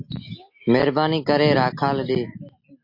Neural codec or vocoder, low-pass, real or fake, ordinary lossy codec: none; 5.4 kHz; real; MP3, 32 kbps